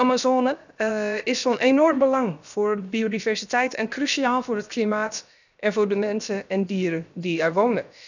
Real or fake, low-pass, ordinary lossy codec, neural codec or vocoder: fake; 7.2 kHz; none; codec, 16 kHz, 0.7 kbps, FocalCodec